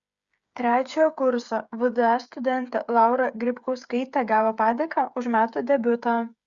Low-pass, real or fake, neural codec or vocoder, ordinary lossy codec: 7.2 kHz; fake; codec, 16 kHz, 8 kbps, FreqCodec, smaller model; Opus, 64 kbps